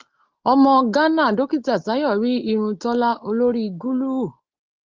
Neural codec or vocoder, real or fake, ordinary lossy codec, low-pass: none; real; Opus, 32 kbps; 7.2 kHz